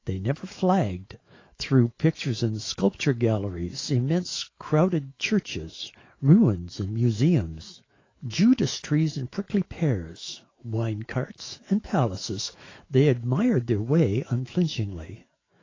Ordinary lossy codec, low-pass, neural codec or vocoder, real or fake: AAC, 32 kbps; 7.2 kHz; autoencoder, 48 kHz, 128 numbers a frame, DAC-VAE, trained on Japanese speech; fake